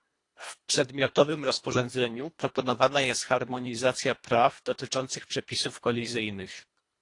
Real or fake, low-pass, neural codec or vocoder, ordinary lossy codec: fake; 10.8 kHz; codec, 24 kHz, 1.5 kbps, HILCodec; AAC, 48 kbps